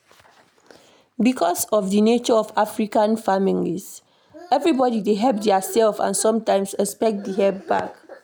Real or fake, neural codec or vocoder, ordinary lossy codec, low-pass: real; none; none; none